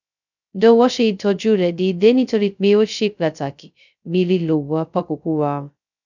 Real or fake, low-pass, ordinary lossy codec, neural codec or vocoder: fake; 7.2 kHz; none; codec, 16 kHz, 0.2 kbps, FocalCodec